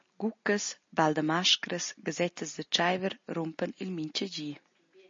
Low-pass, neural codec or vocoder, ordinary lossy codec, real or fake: 7.2 kHz; none; MP3, 32 kbps; real